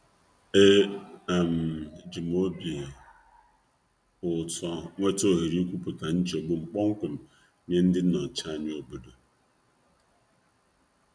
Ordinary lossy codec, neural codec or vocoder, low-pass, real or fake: none; none; 9.9 kHz; real